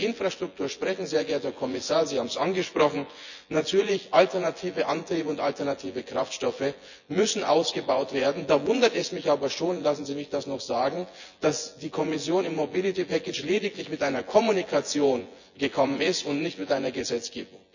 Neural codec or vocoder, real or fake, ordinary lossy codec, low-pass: vocoder, 24 kHz, 100 mel bands, Vocos; fake; none; 7.2 kHz